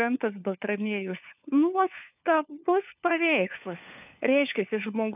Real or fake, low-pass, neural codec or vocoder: fake; 3.6 kHz; codec, 16 kHz, 4 kbps, FunCodec, trained on LibriTTS, 50 frames a second